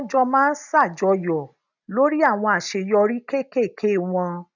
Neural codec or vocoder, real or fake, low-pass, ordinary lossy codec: none; real; 7.2 kHz; none